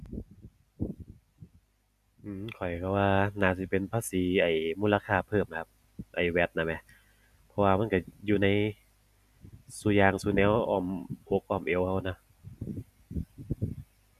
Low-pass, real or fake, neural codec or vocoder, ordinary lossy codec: 14.4 kHz; real; none; none